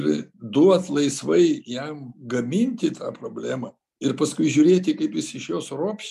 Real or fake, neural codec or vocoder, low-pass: real; none; 14.4 kHz